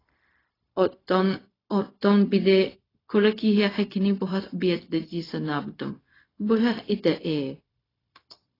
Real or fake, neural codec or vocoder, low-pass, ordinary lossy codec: fake; codec, 16 kHz, 0.4 kbps, LongCat-Audio-Codec; 5.4 kHz; AAC, 24 kbps